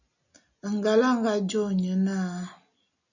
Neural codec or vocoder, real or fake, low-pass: none; real; 7.2 kHz